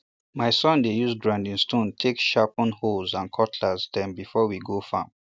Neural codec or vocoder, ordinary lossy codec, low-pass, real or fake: none; none; none; real